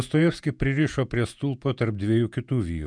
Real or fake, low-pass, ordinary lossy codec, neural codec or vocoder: fake; 9.9 kHz; MP3, 96 kbps; vocoder, 48 kHz, 128 mel bands, Vocos